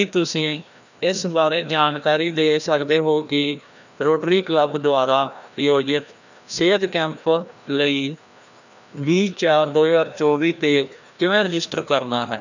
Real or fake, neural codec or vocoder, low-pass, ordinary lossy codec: fake; codec, 16 kHz, 1 kbps, FreqCodec, larger model; 7.2 kHz; none